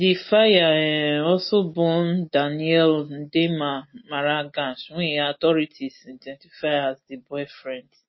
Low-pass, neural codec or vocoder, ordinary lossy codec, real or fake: 7.2 kHz; none; MP3, 24 kbps; real